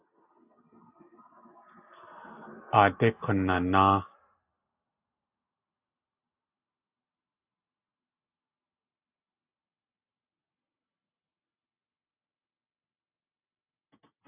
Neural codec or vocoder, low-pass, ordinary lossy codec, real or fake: none; 3.6 kHz; AAC, 32 kbps; real